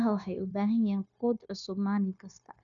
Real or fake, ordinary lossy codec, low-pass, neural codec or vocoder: fake; MP3, 96 kbps; 7.2 kHz; codec, 16 kHz, 0.9 kbps, LongCat-Audio-Codec